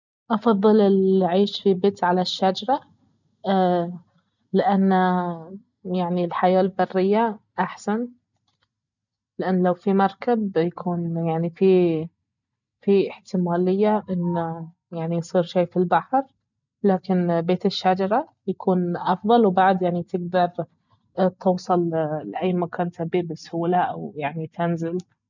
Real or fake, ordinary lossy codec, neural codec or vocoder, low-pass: real; none; none; 7.2 kHz